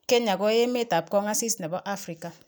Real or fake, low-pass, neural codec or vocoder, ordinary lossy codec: real; none; none; none